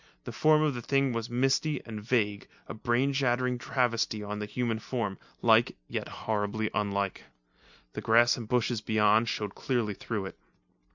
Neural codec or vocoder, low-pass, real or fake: none; 7.2 kHz; real